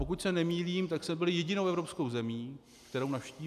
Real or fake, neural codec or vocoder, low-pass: real; none; 14.4 kHz